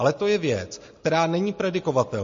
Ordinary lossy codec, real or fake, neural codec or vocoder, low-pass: MP3, 32 kbps; real; none; 7.2 kHz